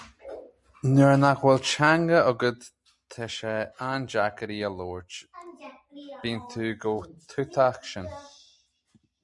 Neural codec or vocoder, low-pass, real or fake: none; 10.8 kHz; real